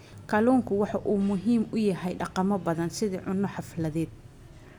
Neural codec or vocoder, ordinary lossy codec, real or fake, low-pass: none; none; real; 19.8 kHz